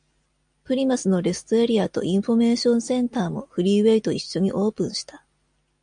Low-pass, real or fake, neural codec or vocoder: 9.9 kHz; real; none